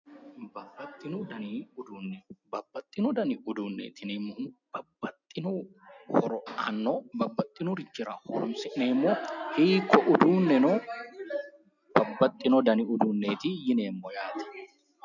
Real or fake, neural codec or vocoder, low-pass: real; none; 7.2 kHz